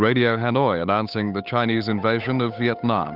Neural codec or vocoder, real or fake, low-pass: codec, 16 kHz, 8 kbps, FunCodec, trained on Chinese and English, 25 frames a second; fake; 5.4 kHz